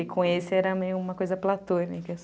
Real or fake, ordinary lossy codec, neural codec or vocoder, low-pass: real; none; none; none